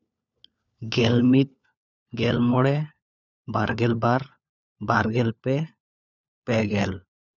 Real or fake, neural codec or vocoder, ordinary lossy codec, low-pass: fake; codec, 16 kHz, 4 kbps, FunCodec, trained on LibriTTS, 50 frames a second; none; 7.2 kHz